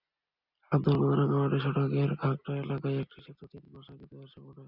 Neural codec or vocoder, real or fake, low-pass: none; real; 5.4 kHz